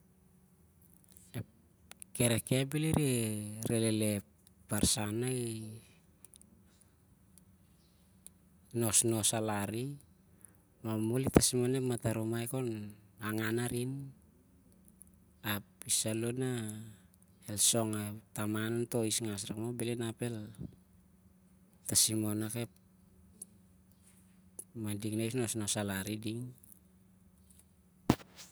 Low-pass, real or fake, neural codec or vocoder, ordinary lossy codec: none; real; none; none